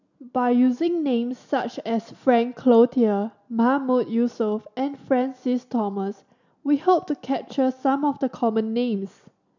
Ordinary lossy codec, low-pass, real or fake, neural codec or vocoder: none; 7.2 kHz; real; none